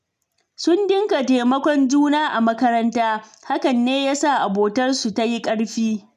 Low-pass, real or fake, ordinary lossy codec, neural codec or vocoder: 14.4 kHz; real; none; none